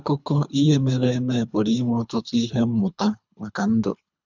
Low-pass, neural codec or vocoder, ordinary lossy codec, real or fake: 7.2 kHz; codec, 24 kHz, 3 kbps, HILCodec; none; fake